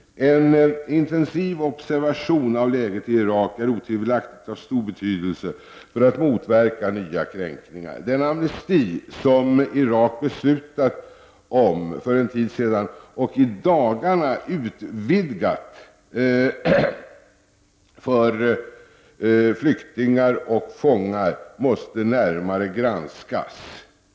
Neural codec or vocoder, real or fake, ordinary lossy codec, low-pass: none; real; none; none